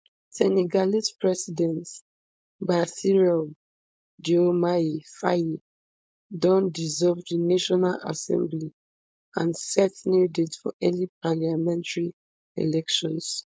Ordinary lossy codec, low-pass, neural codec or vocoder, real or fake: none; none; codec, 16 kHz, 4.8 kbps, FACodec; fake